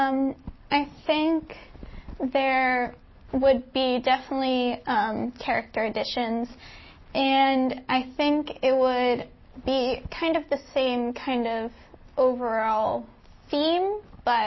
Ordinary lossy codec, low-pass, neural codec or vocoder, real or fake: MP3, 24 kbps; 7.2 kHz; none; real